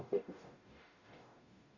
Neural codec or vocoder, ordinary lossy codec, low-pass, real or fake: codec, 44.1 kHz, 0.9 kbps, DAC; none; 7.2 kHz; fake